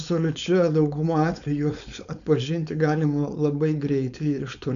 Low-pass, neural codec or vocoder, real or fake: 7.2 kHz; codec, 16 kHz, 4.8 kbps, FACodec; fake